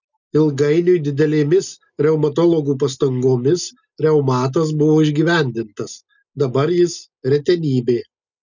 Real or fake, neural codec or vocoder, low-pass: real; none; 7.2 kHz